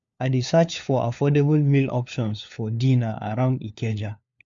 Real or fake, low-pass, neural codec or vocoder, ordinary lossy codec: fake; 7.2 kHz; codec, 16 kHz, 4 kbps, FunCodec, trained on LibriTTS, 50 frames a second; MP3, 64 kbps